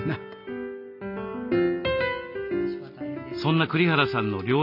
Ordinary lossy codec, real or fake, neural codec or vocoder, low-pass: none; real; none; 5.4 kHz